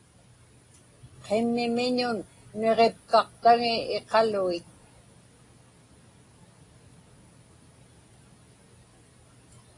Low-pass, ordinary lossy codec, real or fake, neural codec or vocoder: 10.8 kHz; AAC, 32 kbps; real; none